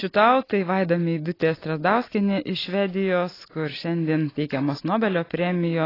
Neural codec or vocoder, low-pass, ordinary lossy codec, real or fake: none; 5.4 kHz; AAC, 24 kbps; real